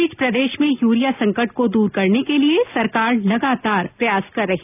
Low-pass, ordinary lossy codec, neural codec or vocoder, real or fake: 3.6 kHz; none; none; real